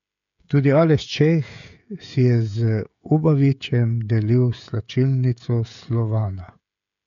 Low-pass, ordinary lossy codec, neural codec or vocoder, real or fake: 7.2 kHz; none; codec, 16 kHz, 16 kbps, FreqCodec, smaller model; fake